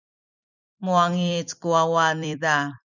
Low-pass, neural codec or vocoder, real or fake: 7.2 kHz; vocoder, 44.1 kHz, 128 mel bands every 256 samples, BigVGAN v2; fake